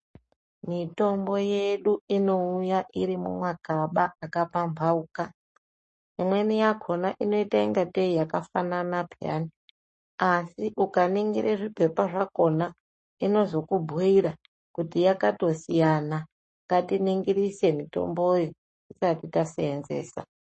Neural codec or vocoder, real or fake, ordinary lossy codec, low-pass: codec, 44.1 kHz, 7.8 kbps, Pupu-Codec; fake; MP3, 32 kbps; 10.8 kHz